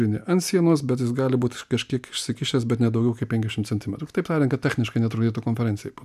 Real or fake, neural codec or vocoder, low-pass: real; none; 14.4 kHz